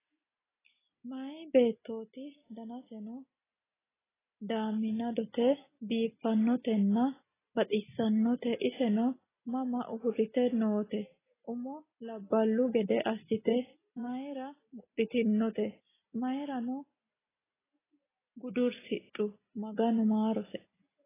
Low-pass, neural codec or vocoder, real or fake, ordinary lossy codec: 3.6 kHz; none; real; AAC, 16 kbps